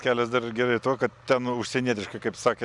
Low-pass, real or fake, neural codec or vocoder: 10.8 kHz; real; none